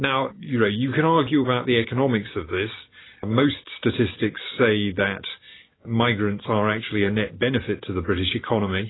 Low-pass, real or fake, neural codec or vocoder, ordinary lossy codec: 7.2 kHz; real; none; AAC, 16 kbps